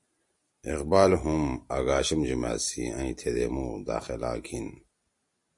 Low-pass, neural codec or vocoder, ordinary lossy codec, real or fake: 10.8 kHz; none; MP3, 48 kbps; real